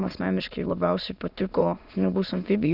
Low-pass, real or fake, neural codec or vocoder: 5.4 kHz; fake; autoencoder, 22.05 kHz, a latent of 192 numbers a frame, VITS, trained on many speakers